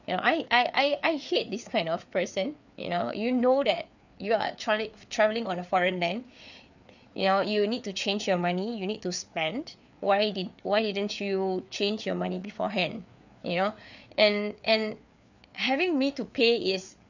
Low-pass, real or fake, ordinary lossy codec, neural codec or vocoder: 7.2 kHz; fake; none; codec, 16 kHz, 4 kbps, FreqCodec, larger model